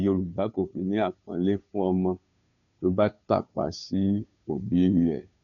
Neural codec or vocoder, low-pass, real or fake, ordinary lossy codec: codec, 16 kHz, 2 kbps, FunCodec, trained on Chinese and English, 25 frames a second; 7.2 kHz; fake; none